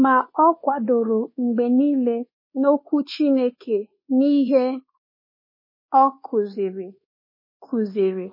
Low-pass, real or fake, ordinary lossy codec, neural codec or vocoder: 5.4 kHz; fake; MP3, 24 kbps; codec, 24 kHz, 1.2 kbps, DualCodec